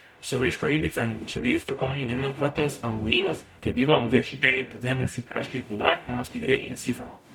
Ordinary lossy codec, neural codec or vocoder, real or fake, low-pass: none; codec, 44.1 kHz, 0.9 kbps, DAC; fake; 19.8 kHz